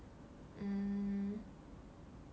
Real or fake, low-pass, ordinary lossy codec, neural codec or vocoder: real; none; none; none